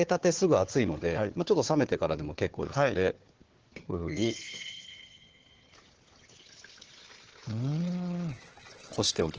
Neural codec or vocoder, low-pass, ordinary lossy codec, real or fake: codec, 16 kHz, 4 kbps, FunCodec, trained on Chinese and English, 50 frames a second; 7.2 kHz; Opus, 16 kbps; fake